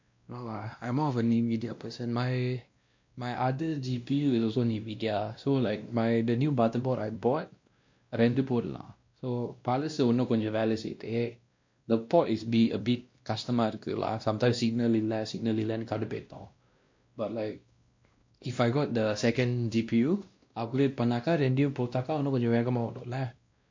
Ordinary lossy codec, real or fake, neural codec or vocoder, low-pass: MP3, 48 kbps; fake; codec, 16 kHz, 1 kbps, X-Codec, WavLM features, trained on Multilingual LibriSpeech; 7.2 kHz